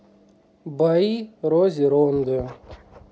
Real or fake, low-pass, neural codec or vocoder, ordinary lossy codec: real; none; none; none